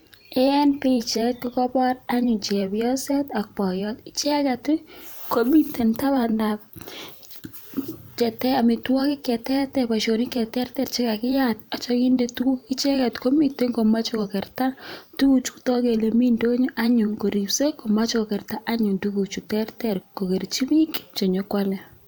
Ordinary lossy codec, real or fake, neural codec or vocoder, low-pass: none; fake; vocoder, 44.1 kHz, 128 mel bands every 512 samples, BigVGAN v2; none